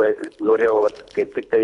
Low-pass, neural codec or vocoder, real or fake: 10.8 kHz; codec, 24 kHz, 3 kbps, HILCodec; fake